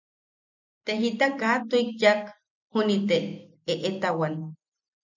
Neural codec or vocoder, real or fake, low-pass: none; real; 7.2 kHz